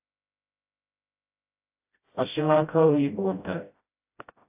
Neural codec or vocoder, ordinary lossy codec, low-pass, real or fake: codec, 16 kHz, 0.5 kbps, FreqCodec, smaller model; AAC, 32 kbps; 3.6 kHz; fake